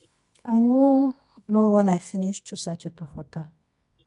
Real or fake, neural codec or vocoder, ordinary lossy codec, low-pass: fake; codec, 24 kHz, 0.9 kbps, WavTokenizer, medium music audio release; MP3, 64 kbps; 10.8 kHz